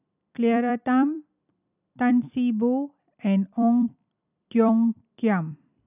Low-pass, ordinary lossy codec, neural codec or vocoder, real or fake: 3.6 kHz; none; vocoder, 44.1 kHz, 128 mel bands every 256 samples, BigVGAN v2; fake